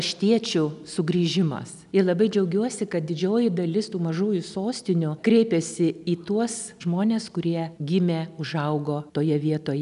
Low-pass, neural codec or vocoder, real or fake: 10.8 kHz; none; real